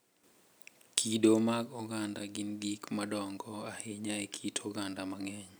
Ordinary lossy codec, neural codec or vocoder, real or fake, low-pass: none; none; real; none